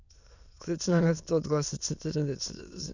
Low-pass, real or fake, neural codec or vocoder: 7.2 kHz; fake; autoencoder, 22.05 kHz, a latent of 192 numbers a frame, VITS, trained on many speakers